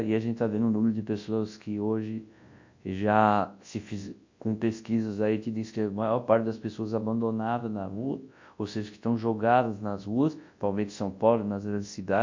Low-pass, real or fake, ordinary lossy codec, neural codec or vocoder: 7.2 kHz; fake; MP3, 48 kbps; codec, 24 kHz, 0.9 kbps, WavTokenizer, large speech release